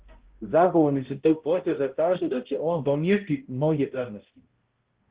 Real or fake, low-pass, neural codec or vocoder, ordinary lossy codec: fake; 3.6 kHz; codec, 16 kHz, 0.5 kbps, X-Codec, HuBERT features, trained on balanced general audio; Opus, 16 kbps